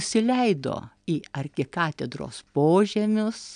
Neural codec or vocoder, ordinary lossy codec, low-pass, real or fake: none; AAC, 96 kbps; 9.9 kHz; real